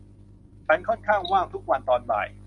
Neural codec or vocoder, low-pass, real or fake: none; 10.8 kHz; real